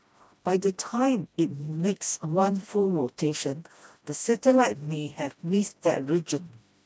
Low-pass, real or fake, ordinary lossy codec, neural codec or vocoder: none; fake; none; codec, 16 kHz, 1 kbps, FreqCodec, smaller model